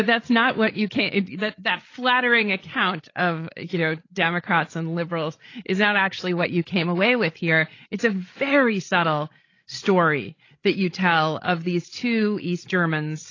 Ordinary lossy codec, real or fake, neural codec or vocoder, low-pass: AAC, 32 kbps; fake; codec, 16 kHz, 16 kbps, FreqCodec, larger model; 7.2 kHz